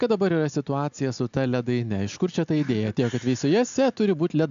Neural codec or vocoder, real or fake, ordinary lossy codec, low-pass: none; real; MP3, 64 kbps; 7.2 kHz